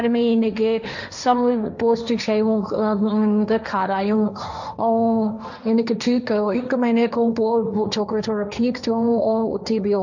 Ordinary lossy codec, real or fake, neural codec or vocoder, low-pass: none; fake; codec, 16 kHz, 1.1 kbps, Voila-Tokenizer; 7.2 kHz